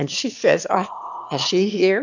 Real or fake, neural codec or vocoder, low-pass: fake; autoencoder, 22.05 kHz, a latent of 192 numbers a frame, VITS, trained on one speaker; 7.2 kHz